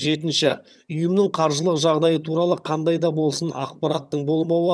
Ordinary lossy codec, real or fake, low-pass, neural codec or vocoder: none; fake; none; vocoder, 22.05 kHz, 80 mel bands, HiFi-GAN